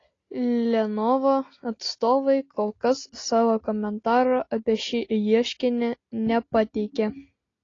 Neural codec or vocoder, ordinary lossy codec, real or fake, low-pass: none; AAC, 32 kbps; real; 7.2 kHz